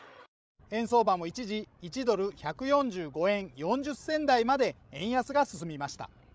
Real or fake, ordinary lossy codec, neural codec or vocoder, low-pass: fake; none; codec, 16 kHz, 16 kbps, FreqCodec, larger model; none